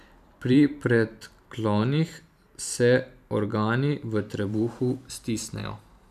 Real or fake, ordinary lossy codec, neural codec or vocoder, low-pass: fake; none; vocoder, 44.1 kHz, 128 mel bands every 512 samples, BigVGAN v2; 14.4 kHz